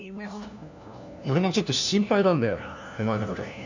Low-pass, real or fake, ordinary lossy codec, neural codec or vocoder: 7.2 kHz; fake; none; codec, 16 kHz, 1 kbps, FunCodec, trained on LibriTTS, 50 frames a second